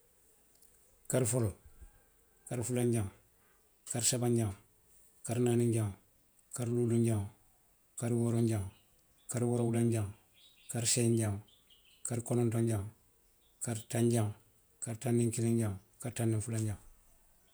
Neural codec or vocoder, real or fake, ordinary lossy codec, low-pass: vocoder, 48 kHz, 128 mel bands, Vocos; fake; none; none